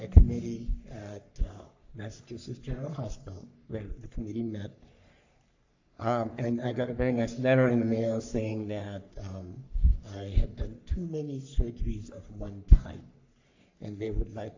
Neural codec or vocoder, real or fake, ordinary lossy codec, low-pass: codec, 44.1 kHz, 3.4 kbps, Pupu-Codec; fake; AAC, 48 kbps; 7.2 kHz